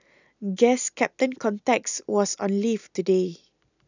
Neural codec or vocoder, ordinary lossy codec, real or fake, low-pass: none; none; real; 7.2 kHz